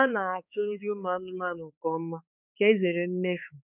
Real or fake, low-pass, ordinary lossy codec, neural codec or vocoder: fake; 3.6 kHz; none; codec, 16 kHz, 4 kbps, X-Codec, HuBERT features, trained on balanced general audio